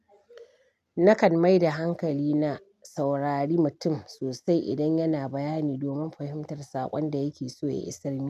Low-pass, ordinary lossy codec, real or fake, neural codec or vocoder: 14.4 kHz; none; real; none